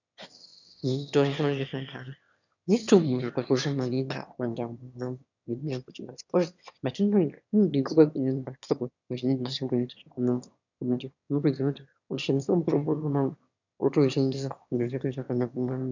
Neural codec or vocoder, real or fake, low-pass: autoencoder, 22.05 kHz, a latent of 192 numbers a frame, VITS, trained on one speaker; fake; 7.2 kHz